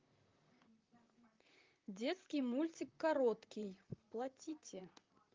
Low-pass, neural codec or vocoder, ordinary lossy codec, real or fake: 7.2 kHz; vocoder, 44.1 kHz, 128 mel bands, Pupu-Vocoder; Opus, 24 kbps; fake